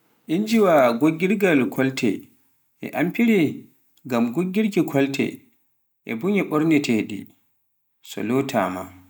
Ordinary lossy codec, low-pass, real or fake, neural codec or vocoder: none; none; real; none